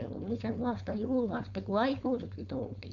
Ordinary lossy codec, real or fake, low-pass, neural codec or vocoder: none; fake; 7.2 kHz; codec, 16 kHz, 4.8 kbps, FACodec